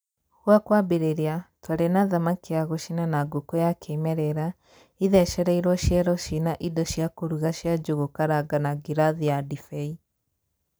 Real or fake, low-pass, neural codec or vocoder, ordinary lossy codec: real; none; none; none